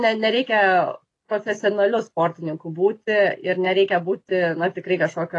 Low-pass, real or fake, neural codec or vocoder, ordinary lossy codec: 10.8 kHz; real; none; AAC, 32 kbps